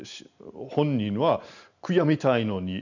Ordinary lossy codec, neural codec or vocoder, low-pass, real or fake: none; none; 7.2 kHz; real